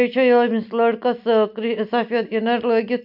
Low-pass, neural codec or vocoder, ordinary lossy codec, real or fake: 5.4 kHz; none; AAC, 48 kbps; real